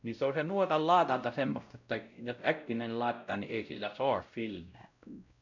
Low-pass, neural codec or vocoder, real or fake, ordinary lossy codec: 7.2 kHz; codec, 16 kHz, 0.5 kbps, X-Codec, WavLM features, trained on Multilingual LibriSpeech; fake; none